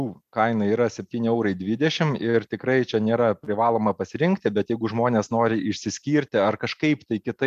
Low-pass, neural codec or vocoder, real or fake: 14.4 kHz; none; real